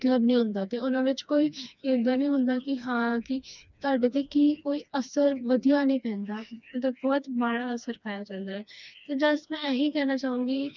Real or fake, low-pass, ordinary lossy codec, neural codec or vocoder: fake; 7.2 kHz; none; codec, 16 kHz, 2 kbps, FreqCodec, smaller model